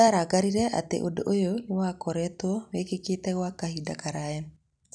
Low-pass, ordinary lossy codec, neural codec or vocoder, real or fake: 9.9 kHz; none; none; real